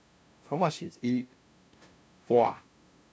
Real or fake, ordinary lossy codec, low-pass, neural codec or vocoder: fake; none; none; codec, 16 kHz, 0.5 kbps, FunCodec, trained on LibriTTS, 25 frames a second